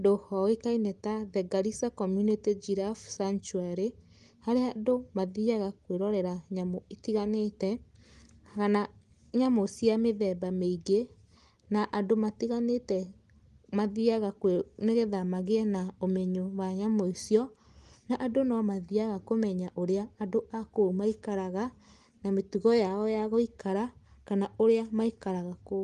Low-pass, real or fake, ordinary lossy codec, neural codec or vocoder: 10.8 kHz; real; Opus, 24 kbps; none